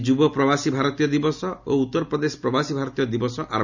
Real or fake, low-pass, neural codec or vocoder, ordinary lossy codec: real; 7.2 kHz; none; none